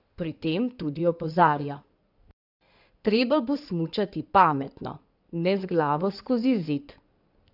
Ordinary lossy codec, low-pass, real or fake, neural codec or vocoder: none; 5.4 kHz; fake; codec, 16 kHz in and 24 kHz out, 2.2 kbps, FireRedTTS-2 codec